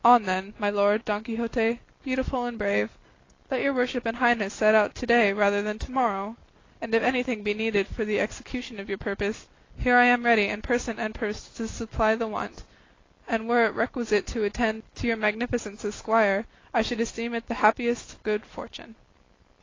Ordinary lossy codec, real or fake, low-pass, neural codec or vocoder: AAC, 32 kbps; real; 7.2 kHz; none